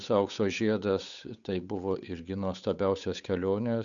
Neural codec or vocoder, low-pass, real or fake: codec, 16 kHz, 8 kbps, FunCodec, trained on Chinese and English, 25 frames a second; 7.2 kHz; fake